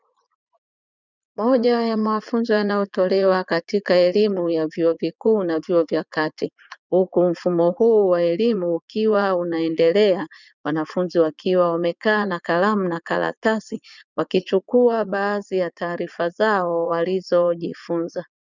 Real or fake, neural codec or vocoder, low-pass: fake; vocoder, 44.1 kHz, 80 mel bands, Vocos; 7.2 kHz